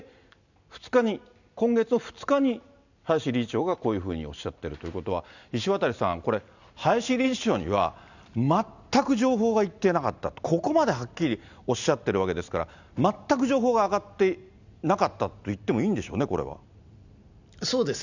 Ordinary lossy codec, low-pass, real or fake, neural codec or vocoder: none; 7.2 kHz; real; none